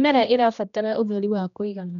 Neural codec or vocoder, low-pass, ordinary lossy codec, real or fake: codec, 16 kHz, 1 kbps, X-Codec, HuBERT features, trained on general audio; 7.2 kHz; none; fake